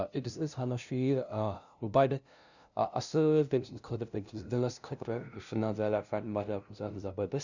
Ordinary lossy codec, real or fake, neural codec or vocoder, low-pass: none; fake; codec, 16 kHz, 0.5 kbps, FunCodec, trained on LibriTTS, 25 frames a second; 7.2 kHz